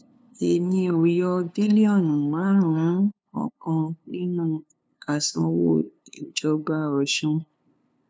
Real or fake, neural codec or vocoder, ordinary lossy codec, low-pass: fake; codec, 16 kHz, 2 kbps, FunCodec, trained on LibriTTS, 25 frames a second; none; none